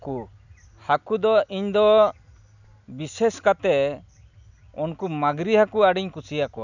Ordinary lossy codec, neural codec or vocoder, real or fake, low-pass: none; none; real; 7.2 kHz